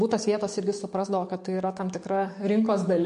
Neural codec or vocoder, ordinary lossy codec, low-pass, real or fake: codec, 44.1 kHz, 7.8 kbps, DAC; MP3, 48 kbps; 14.4 kHz; fake